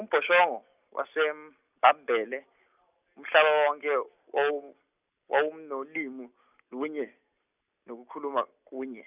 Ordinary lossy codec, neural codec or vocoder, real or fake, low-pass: none; none; real; 3.6 kHz